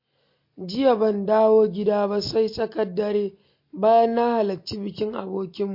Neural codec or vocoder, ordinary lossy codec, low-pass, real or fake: none; MP3, 32 kbps; 5.4 kHz; real